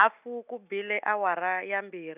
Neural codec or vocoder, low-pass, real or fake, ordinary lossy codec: none; 3.6 kHz; real; none